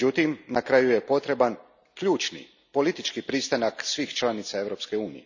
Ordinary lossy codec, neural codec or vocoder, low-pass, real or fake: none; none; 7.2 kHz; real